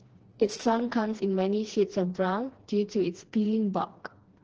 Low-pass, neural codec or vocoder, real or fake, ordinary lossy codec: 7.2 kHz; codec, 16 kHz, 2 kbps, FreqCodec, smaller model; fake; Opus, 16 kbps